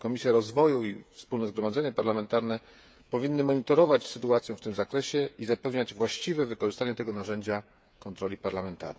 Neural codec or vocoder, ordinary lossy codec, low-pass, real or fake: codec, 16 kHz, 8 kbps, FreqCodec, smaller model; none; none; fake